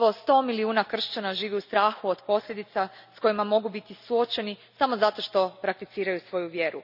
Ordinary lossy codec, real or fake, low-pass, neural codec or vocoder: none; real; 5.4 kHz; none